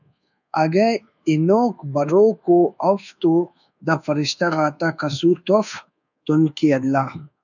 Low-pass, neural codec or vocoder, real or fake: 7.2 kHz; codec, 24 kHz, 1.2 kbps, DualCodec; fake